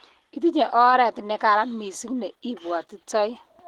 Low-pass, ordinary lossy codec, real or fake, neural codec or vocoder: 19.8 kHz; Opus, 16 kbps; real; none